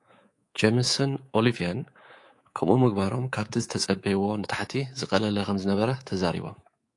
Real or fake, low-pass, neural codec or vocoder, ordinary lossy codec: fake; 10.8 kHz; codec, 24 kHz, 3.1 kbps, DualCodec; AAC, 48 kbps